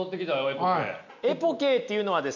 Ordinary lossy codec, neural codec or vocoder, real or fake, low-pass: none; none; real; 7.2 kHz